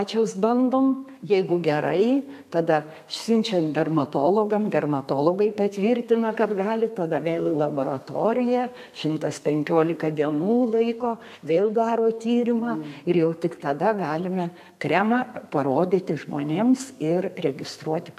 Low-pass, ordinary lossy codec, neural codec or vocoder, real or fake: 14.4 kHz; AAC, 96 kbps; codec, 32 kHz, 1.9 kbps, SNAC; fake